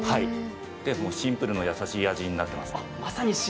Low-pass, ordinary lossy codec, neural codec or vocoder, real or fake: none; none; none; real